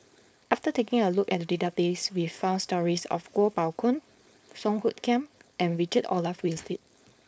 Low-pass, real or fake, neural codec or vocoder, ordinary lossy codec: none; fake; codec, 16 kHz, 4.8 kbps, FACodec; none